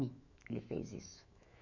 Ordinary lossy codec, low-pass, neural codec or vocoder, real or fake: none; 7.2 kHz; autoencoder, 48 kHz, 128 numbers a frame, DAC-VAE, trained on Japanese speech; fake